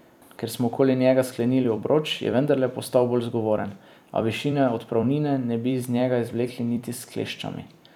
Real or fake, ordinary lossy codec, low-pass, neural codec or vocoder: fake; none; 19.8 kHz; vocoder, 44.1 kHz, 128 mel bands every 256 samples, BigVGAN v2